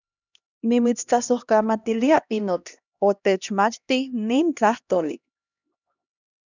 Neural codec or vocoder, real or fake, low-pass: codec, 16 kHz, 1 kbps, X-Codec, HuBERT features, trained on LibriSpeech; fake; 7.2 kHz